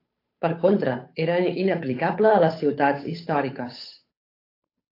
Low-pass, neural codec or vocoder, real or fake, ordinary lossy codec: 5.4 kHz; codec, 16 kHz, 8 kbps, FunCodec, trained on Chinese and English, 25 frames a second; fake; AAC, 32 kbps